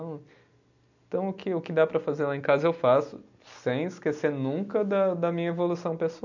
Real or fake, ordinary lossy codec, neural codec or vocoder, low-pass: real; none; none; 7.2 kHz